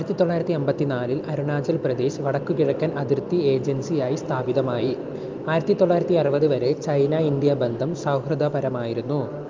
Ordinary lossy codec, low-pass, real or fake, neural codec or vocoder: Opus, 24 kbps; 7.2 kHz; real; none